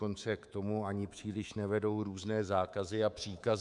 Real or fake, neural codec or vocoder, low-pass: fake; codec, 24 kHz, 3.1 kbps, DualCodec; 10.8 kHz